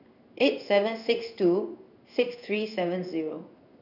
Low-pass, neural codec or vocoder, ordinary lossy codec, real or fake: 5.4 kHz; codec, 16 kHz in and 24 kHz out, 1 kbps, XY-Tokenizer; none; fake